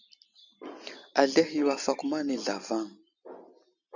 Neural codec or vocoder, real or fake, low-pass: none; real; 7.2 kHz